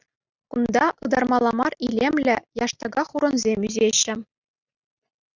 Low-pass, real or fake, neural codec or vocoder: 7.2 kHz; real; none